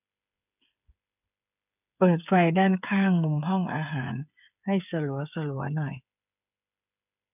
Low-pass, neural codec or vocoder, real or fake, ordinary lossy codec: 3.6 kHz; codec, 16 kHz, 8 kbps, FreqCodec, smaller model; fake; none